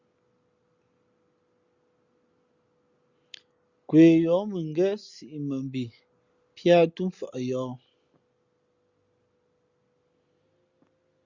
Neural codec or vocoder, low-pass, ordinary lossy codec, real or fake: none; 7.2 kHz; Opus, 64 kbps; real